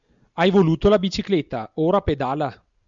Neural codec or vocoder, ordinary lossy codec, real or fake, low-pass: none; MP3, 96 kbps; real; 7.2 kHz